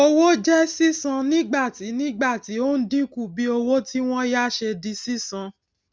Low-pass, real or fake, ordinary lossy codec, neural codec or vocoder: none; real; none; none